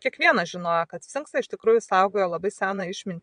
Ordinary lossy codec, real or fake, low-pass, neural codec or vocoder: MP3, 64 kbps; fake; 9.9 kHz; vocoder, 22.05 kHz, 80 mel bands, Vocos